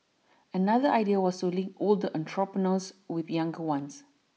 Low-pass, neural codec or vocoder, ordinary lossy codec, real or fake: none; none; none; real